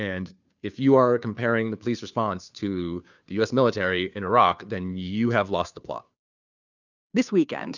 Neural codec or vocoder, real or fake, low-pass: codec, 16 kHz, 2 kbps, FunCodec, trained on Chinese and English, 25 frames a second; fake; 7.2 kHz